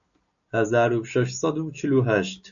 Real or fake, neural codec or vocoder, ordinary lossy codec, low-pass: real; none; AAC, 64 kbps; 7.2 kHz